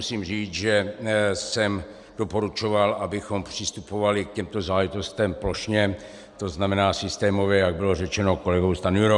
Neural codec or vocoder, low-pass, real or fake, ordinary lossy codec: none; 10.8 kHz; real; Opus, 64 kbps